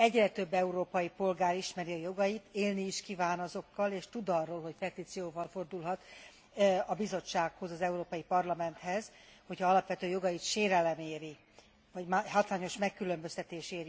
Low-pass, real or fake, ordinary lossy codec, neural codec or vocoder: none; real; none; none